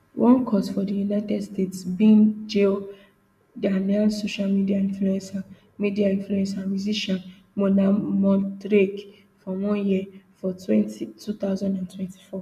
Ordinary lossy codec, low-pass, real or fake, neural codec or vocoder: none; 14.4 kHz; real; none